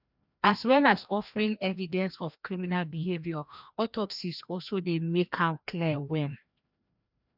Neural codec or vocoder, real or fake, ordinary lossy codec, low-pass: codec, 16 kHz, 1 kbps, FreqCodec, larger model; fake; none; 5.4 kHz